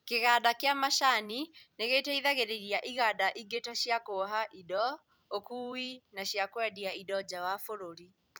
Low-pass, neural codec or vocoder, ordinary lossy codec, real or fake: none; vocoder, 44.1 kHz, 128 mel bands every 256 samples, BigVGAN v2; none; fake